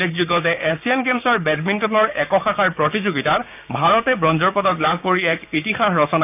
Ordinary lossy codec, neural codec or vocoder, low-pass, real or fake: none; codec, 16 kHz, 6 kbps, DAC; 3.6 kHz; fake